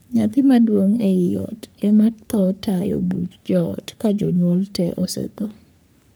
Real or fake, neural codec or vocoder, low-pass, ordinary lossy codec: fake; codec, 44.1 kHz, 3.4 kbps, Pupu-Codec; none; none